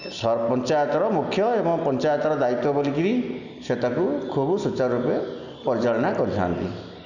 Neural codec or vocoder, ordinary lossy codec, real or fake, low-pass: none; none; real; 7.2 kHz